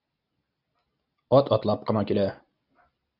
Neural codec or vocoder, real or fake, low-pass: none; real; 5.4 kHz